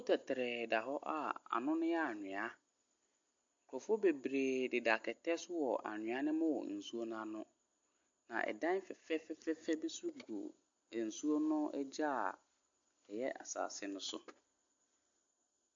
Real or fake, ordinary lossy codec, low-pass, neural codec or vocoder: real; MP3, 48 kbps; 7.2 kHz; none